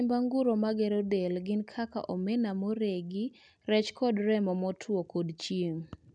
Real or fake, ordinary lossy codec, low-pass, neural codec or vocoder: real; none; 9.9 kHz; none